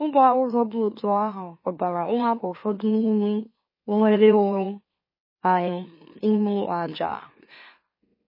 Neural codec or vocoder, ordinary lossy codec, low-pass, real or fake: autoencoder, 44.1 kHz, a latent of 192 numbers a frame, MeloTTS; MP3, 24 kbps; 5.4 kHz; fake